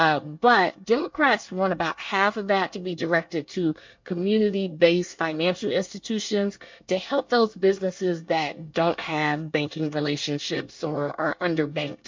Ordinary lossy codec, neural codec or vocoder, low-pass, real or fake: MP3, 48 kbps; codec, 24 kHz, 1 kbps, SNAC; 7.2 kHz; fake